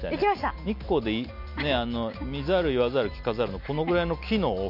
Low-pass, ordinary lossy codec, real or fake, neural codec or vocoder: 5.4 kHz; none; real; none